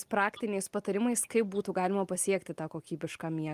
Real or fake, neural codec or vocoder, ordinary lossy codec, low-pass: real; none; Opus, 32 kbps; 14.4 kHz